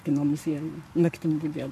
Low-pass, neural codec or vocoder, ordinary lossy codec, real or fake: 14.4 kHz; codec, 44.1 kHz, 7.8 kbps, Pupu-Codec; MP3, 64 kbps; fake